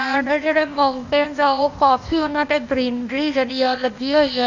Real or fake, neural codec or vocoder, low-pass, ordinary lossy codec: fake; codec, 16 kHz, 0.8 kbps, ZipCodec; 7.2 kHz; none